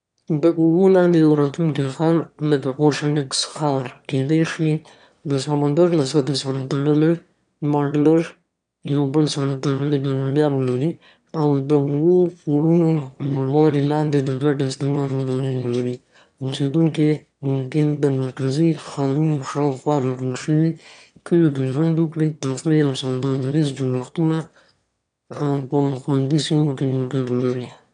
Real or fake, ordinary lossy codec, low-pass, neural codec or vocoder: fake; none; 9.9 kHz; autoencoder, 22.05 kHz, a latent of 192 numbers a frame, VITS, trained on one speaker